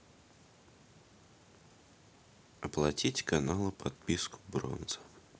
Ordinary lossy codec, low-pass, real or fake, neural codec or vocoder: none; none; real; none